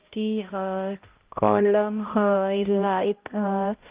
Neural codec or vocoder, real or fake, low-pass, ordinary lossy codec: codec, 16 kHz, 0.5 kbps, X-Codec, HuBERT features, trained on balanced general audio; fake; 3.6 kHz; Opus, 32 kbps